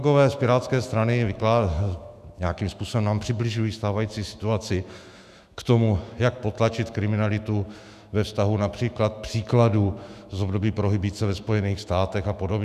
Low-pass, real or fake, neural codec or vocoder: 14.4 kHz; fake; autoencoder, 48 kHz, 128 numbers a frame, DAC-VAE, trained on Japanese speech